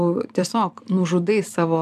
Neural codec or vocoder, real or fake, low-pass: vocoder, 44.1 kHz, 128 mel bands every 256 samples, BigVGAN v2; fake; 14.4 kHz